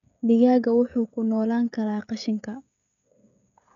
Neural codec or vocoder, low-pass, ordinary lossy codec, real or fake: codec, 16 kHz, 16 kbps, FreqCodec, smaller model; 7.2 kHz; none; fake